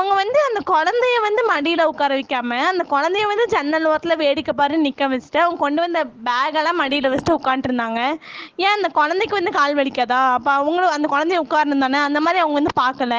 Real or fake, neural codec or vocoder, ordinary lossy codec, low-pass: real; none; Opus, 16 kbps; 7.2 kHz